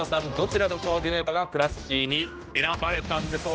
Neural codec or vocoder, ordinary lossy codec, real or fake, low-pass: codec, 16 kHz, 1 kbps, X-Codec, HuBERT features, trained on general audio; none; fake; none